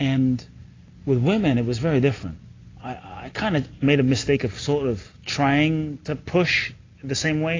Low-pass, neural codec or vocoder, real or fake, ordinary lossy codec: 7.2 kHz; none; real; AAC, 32 kbps